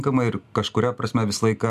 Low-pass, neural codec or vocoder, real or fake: 14.4 kHz; none; real